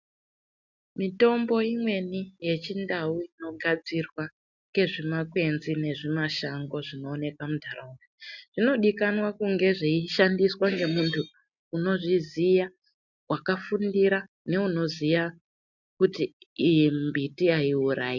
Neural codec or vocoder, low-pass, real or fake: none; 7.2 kHz; real